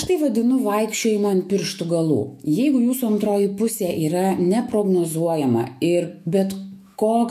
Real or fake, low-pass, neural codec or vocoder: fake; 14.4 kHz; autoencoder, 48 kHz, 128 numbers a frame, DAC-VAE, trained on Japanese speech